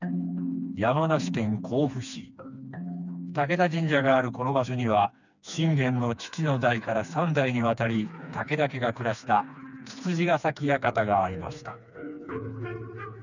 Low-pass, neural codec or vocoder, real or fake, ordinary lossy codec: 7.2 kHz; codec, 16 kHz, 2 kbps, FreqCodec, smaller model; fake; none